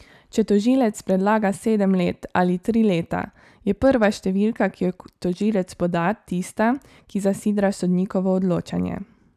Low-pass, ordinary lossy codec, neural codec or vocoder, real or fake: 14.4 kHz; none; none; real